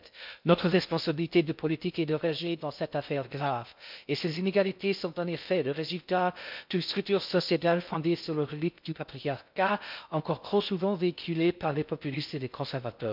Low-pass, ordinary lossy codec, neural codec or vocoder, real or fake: 5.4 kHz; MP3, 48 kbps; codec, 16 kHz in and 24 kHz out, 0.6 kbps, FocalCodec, streaming, 2048 codes; fake